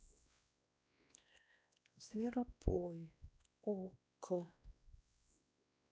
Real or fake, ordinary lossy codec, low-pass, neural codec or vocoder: fake; none; none; codec, 16 kHz, 1 kbps, X-Codec, WavLM features, trained on Multilingual LibriSpeech